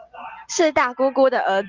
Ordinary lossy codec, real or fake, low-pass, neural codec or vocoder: Opus, 32 kbps; real; 7.2 kHz; none